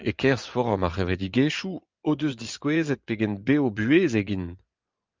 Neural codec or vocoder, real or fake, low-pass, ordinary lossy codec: vocoder, 24 kHz, 100 mel bands, Vocos; fake; 7.2 kHz; Opus, 24 kbps